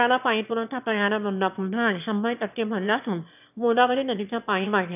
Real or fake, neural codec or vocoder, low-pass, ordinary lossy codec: fake; autoencoder, 22.05 kHz, a latent of 192 numbers a frame, VITS, trained on one speaker; 3.6 kHz; none